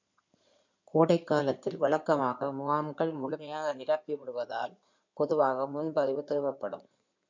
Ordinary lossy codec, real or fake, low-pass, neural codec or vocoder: MP3, 64 kbps; fake; 7.2 kHz; codec, 16 kHz in and 24 kHz out, 2.2 kbps, FireRedTTS-2 codec